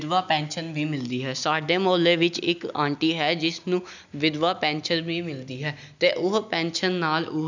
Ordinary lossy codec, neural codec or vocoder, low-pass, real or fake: none; none; 7.2 kHz; real